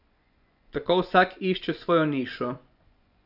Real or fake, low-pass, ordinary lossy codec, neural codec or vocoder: fake; 5.4 kHz; none; vocoder, 24 kHz, 100 mel bands, Vocos